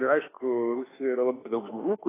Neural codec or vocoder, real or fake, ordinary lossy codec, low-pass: autoencoder, 48 kHz, 32 numbers a frame, DAC-VAE, trained on Japanese speech; fake; AAC, 16 kbps; 3.6 kHz